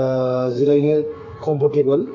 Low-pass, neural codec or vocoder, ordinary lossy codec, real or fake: 7.2 kHz; codec, 32 kHz, 1.9 kbps, SNAC; AAC, 48 kbps; fake